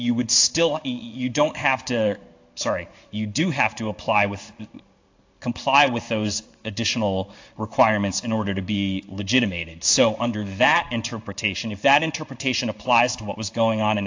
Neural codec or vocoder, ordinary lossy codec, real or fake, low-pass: codec, 16 kHz in and 24 kHz out, 1 kbps, XY-Tokenizer; AAC, 48 kbps; fake; 7.2 kHz